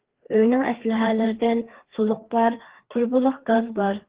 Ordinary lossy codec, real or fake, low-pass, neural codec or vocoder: Opus, 16 kbps; fake; 3.6 kHz; codec, 16 kHz, 2 kbps, FreqCodec, larger model